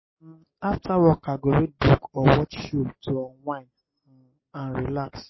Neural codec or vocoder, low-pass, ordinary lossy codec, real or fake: none; 7.2 kHz; MP3, 24 kbps; real